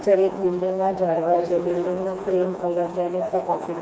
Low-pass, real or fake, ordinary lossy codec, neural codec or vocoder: none; fake; none; codec, 16 kHz, 2 kbps, FreqCodec, smaller model